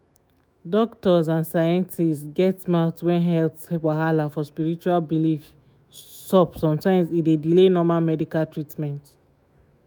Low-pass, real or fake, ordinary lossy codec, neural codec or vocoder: none; fake; none; autoencoder, 48 kHz, 128 numbers a frame, DAC-VAE, trained on Japanese speech